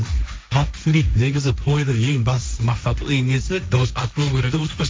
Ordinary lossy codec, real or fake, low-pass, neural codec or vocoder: none; fake; none; codec, 16 kHz, 1.1 kbps, Voila-Tokenizer